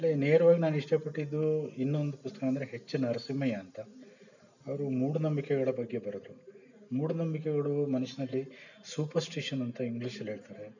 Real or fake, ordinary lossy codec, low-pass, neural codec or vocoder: real; none; 7.2 kHz; none